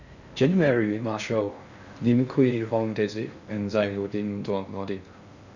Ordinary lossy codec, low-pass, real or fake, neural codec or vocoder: none; 7.2 kHz; fake; codec, 16 kHz in and 24 kHz out, 0.6 kbps, FocalCodec, streaming, 4096 codes